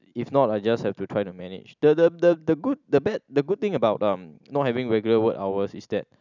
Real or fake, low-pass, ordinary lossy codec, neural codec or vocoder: real; 7.2 kHz; none; none